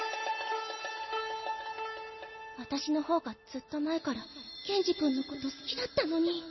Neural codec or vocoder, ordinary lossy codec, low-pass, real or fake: none; MP3, 24 kbps; 7.2 kHz; real